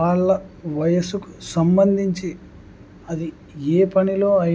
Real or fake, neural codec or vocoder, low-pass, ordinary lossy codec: real; none; none; none